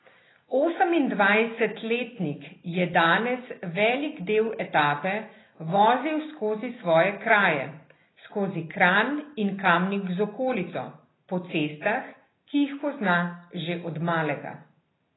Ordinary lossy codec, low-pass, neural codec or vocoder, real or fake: AAC, 16 kbps; 7.2 kHz; none; real